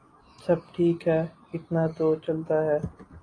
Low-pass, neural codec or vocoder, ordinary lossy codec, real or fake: 9.9 kHz; none; AAC, 32 kbps; real